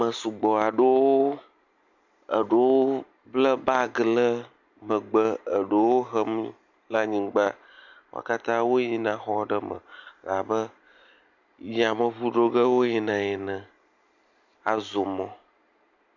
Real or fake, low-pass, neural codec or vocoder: real; 7.2 kHz; none